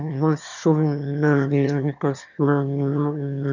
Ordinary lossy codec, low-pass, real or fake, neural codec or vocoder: none; 7.2 kHz; fake; autoencoder, 22.05 kHz, a latent of 192 numbers a frame, VITS, trained on one speaker